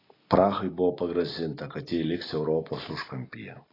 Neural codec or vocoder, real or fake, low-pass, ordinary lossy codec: none; real; 5.4 kHz; AAC, 24 kbps